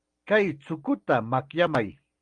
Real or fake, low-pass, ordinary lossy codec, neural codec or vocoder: real; 9.9 kHz; Opus, 24 kbps; none